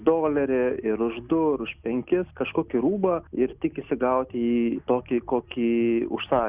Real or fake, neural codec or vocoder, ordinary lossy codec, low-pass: real; none; Opus, 64 kbps; 3.6 kHz